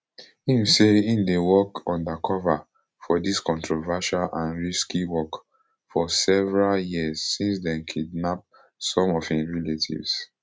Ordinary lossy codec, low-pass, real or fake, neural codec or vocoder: none; none; real; none